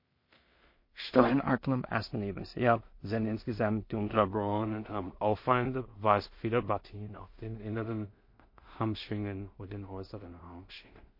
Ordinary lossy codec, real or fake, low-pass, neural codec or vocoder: MP3, 32 kbps; fake; 5.4 kHz; codec, 16 kHz in and 24 kHz out, 0.4 kbps, LongCat-Audio-Codec, two codebook decoder